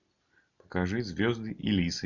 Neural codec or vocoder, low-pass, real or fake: none; 7.2 kHz; real